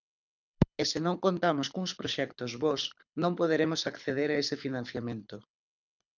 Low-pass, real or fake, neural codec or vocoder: 7.2 kHz; fake; codec, 24 kHz, 6 kbps, HILCodec